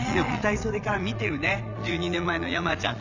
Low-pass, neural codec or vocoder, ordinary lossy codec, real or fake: 7.2 kHz; vocoder, 22.05 kHz, 80 mel bands, Vocos; none; fake